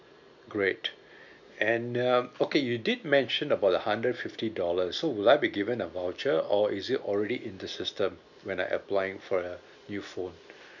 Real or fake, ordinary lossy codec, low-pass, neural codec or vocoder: real; none; 7.2 kHz; none